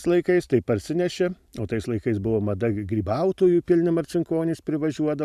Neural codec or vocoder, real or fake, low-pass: vocoder, 44.1 kHz, 128 mel bands every 512 samples, BigVGAN v2; fake; 14.4 kHz